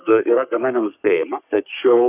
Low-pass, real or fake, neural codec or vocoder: 3.6 kHz; fake; codec, 44.1 kHz, 3.4 kbps, Pupu-Codec